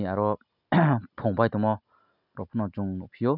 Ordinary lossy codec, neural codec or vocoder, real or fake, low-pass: none; none; real; 5.4 kHz